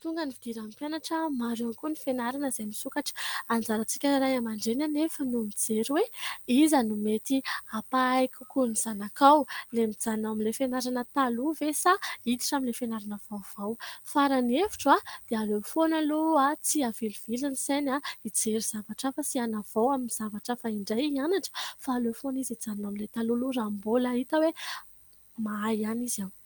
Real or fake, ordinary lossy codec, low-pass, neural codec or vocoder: real; Opus, 24 kbps; 19.8 kHz; none